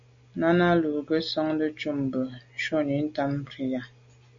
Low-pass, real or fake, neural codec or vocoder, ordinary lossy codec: 7.2 kHz; real; none; MP3, 48 kbps